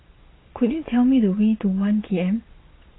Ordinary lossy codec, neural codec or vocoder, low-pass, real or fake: AAC, 16 kbps; vocoder, 22.05 kHz, 80 mel bands, Vocos; 7.2 kHz; fake